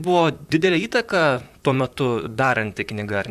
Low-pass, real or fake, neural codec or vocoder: 14.4 kHz; fake; vocoder, 44.1 kHz, 128 mel bands, Pupu-Vocoder